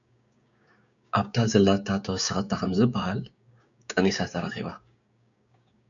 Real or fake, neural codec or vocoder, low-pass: fake; codec, 16 kHz, 6 kbps, DAC; 7.2 kHz